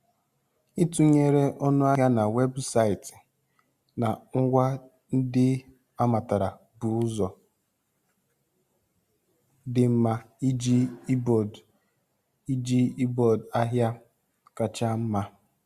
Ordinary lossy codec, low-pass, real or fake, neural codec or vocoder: Opus, 64 kbps; 14.4 kHz; real; none